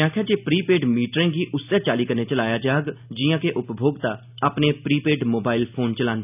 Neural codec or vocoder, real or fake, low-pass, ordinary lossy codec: none; real; 3.6 kHz; none